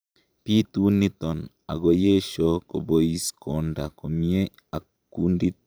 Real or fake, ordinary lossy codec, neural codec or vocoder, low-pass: fake; none; vocoder, 44.1 kHz, 128 mel bands every 512 samples, BigVGAN v2; none